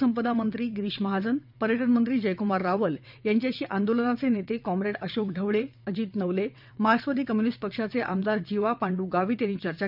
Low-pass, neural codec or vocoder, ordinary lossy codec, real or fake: 5.4 kHz; vocoder, 22.05 kHz, 80 mel bands, WaveNeXt; none; fake